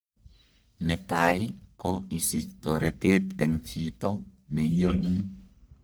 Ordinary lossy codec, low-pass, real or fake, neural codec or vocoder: none; none; fake; codec, 44.1 kHz, 1.7 kbps, Pupu-Codec